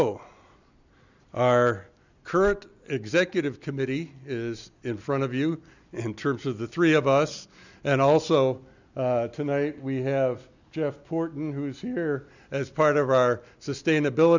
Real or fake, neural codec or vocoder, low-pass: real; none; 7.2 kHz